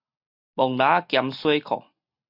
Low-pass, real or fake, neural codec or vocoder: 5.4 kHz; real; none